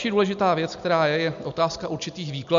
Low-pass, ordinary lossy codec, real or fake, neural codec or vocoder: 7.2 kHz; MP3, 64 kbps; real; none